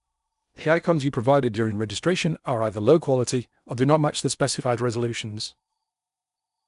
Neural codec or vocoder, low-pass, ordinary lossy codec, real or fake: codec, 16 kHz in and 24 kHz out, 0.8 kbps, FocalCodec, streaming, 65536 codes; 10.8 kHz; none; fake